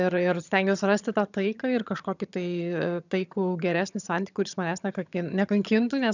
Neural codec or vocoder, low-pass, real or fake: vocoder, 22.05 kHz, 80 mel bands, HiFi-GAN; 7.2 kHz; fake